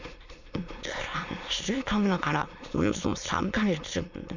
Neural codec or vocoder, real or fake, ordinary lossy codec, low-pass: autoencoder, 22.05 kHz, a latent of 192 numbers a frame, VITS, trained on many speakers; fake; Opus, 64 kbps; 7.2 kHz